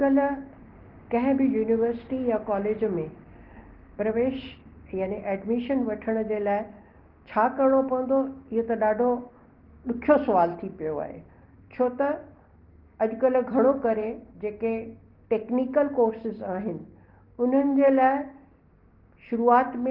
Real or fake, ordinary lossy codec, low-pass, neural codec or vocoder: real; Opus, 16 kbps; 5.4 kHz; none